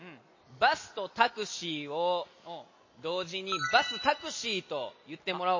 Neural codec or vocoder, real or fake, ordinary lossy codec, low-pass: none; real; MP3, 32 kbps; 7.2 kHz